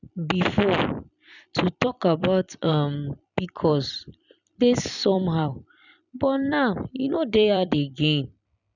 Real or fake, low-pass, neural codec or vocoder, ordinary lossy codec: fake; 7.2 kHz; vocoder, 44.1 kHz, 128 mel bands every 256 samples, BigVGAN v2; none